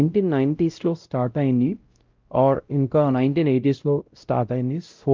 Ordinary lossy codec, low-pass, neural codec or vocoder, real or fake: Opus, 24 kbps; 7.2 kHz; codec, 16 kHz, 0.5 kbps, X-Codec, WavLM features, trained on Multilingual LibriSpeech; fake